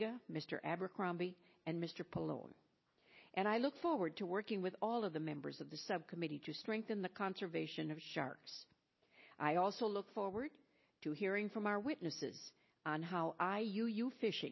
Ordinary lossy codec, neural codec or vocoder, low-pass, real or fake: MP3, 24 kbps; none; 7.2 kHz; real